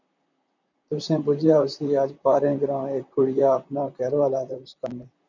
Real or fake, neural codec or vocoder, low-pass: fake; vocoder, 44.1 kHz, 128 mel bands, Pupu-Vocoder; 7.2 kHz